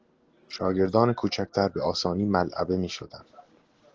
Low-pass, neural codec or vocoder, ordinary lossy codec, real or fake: 7.2 kHz; none; Opus, 16 kbps; real